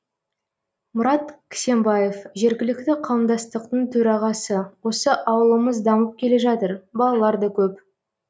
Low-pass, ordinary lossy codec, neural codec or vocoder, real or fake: none; none; none; real